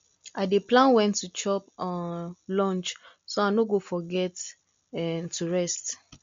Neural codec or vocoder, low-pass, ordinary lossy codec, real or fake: none; 7.2 kHz; MP3, 48 kbps; real